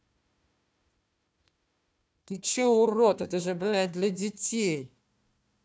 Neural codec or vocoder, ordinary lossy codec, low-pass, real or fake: codec, 16 kHz, 1 kbps, FunCodec, trained on Chinese and English, 50 frames a second; none; none; fake